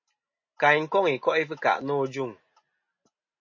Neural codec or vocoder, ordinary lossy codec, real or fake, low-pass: none; MP3, 32 kbps; real; 7.2 kHz